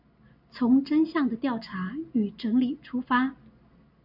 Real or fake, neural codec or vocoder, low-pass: real; none; 5.4 kHz